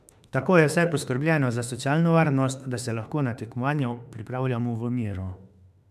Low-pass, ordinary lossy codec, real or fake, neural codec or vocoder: 14.4 kHz; none; fake; autoencoder, 48 kHz, 32 numbers a frame, DAC-VAE, trained on Japanese speech